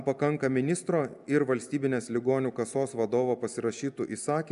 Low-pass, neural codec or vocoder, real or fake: 10.8 kHz; none; real